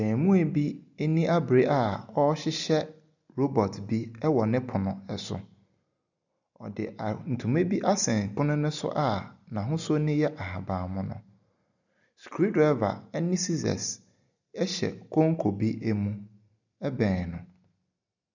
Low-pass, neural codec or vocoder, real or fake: 7.2 kHz; none; real